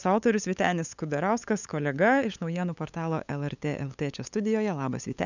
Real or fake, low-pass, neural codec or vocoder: fake; 7.2 kHz; codec, 16 kHz, 8 kbps, FunCodec, trained on LibriTTS, 25 frames a second